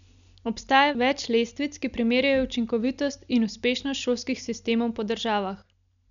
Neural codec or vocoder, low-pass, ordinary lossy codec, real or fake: none; 7.2 kHz; none; real